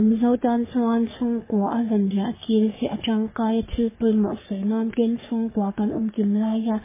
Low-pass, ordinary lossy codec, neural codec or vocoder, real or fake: 3.6 kHz; MP3, 16 kbps; codec, 44.1 kHz, 3.4 kbps, Pupu-Codec; fake